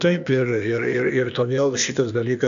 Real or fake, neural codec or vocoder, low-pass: fake; codec, 16 kHz, 0.8 kbps, ZipCodec; 7.2 kHz